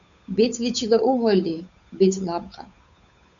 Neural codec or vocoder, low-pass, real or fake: codec, 16 kHz, 8 kbps, FunCodec, trained on Chinese and English, 25 frames a second; 7.2 kHz; fake